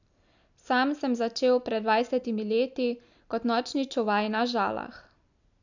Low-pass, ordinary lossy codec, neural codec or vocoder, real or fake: 7.2 kHz; none; none; real